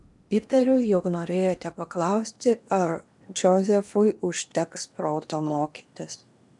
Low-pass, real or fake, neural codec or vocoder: 10.8 kHz; fake; codec, 16 kHz in and 24 kHz out, 0.8 kbps, FocalCodec, streaming, 65536 codes